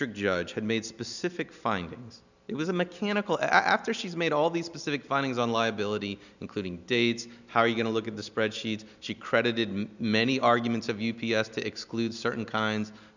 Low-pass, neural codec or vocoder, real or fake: 7.2 kHz; none; real